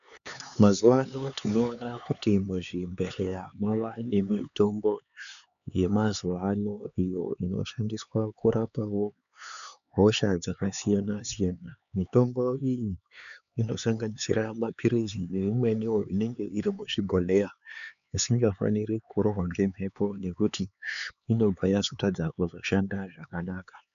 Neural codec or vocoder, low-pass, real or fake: codec, 16 kHz, 4 kbps, X-Codec, HuBERT features, trained on LibriSpeech; 7.2 kHz; fake